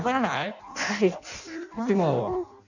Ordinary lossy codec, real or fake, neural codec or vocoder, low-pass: none; fake; codec, 16 kHz in and 24 kHz out, 0.6 kbps, FireRedTTS-2 codec; 7.2 kHz